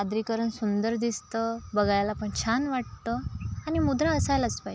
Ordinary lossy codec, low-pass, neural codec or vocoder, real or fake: none; none; none; real